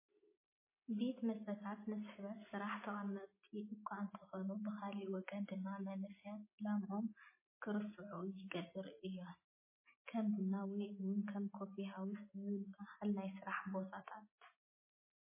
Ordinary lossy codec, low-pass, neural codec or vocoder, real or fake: MP3, 16 kbps; 3.6 kHz; none; real